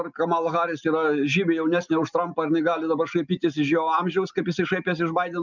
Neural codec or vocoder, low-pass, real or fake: none; 7.2 kHz; real